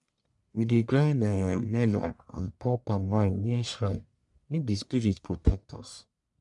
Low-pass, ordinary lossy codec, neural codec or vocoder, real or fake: 10.8 kHz; MP3, 96 kbps; codec, 44.1 kHz, 1.7 kbps, Pupu-Codec; fake